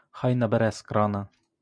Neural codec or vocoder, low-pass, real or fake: none; 9.9 kHz; real